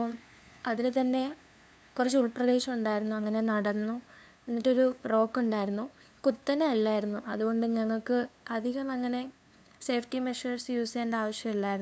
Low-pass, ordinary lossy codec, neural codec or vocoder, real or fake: none; none; codec, 16 kHz, 2 kbps, FunCodec, trained on LibriTTS, 25 frames a second; fake